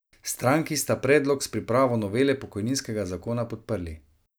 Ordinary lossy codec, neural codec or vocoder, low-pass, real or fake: none; none; none; real